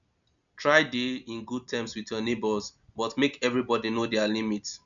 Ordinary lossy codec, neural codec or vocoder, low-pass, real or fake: none; none; 7.2 kHz; real